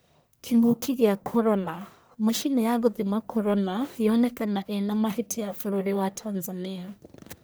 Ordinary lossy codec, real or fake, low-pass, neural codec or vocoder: none; fake; none; codec, 44.1 kHz, 1.7 kbps, Pupu-Codec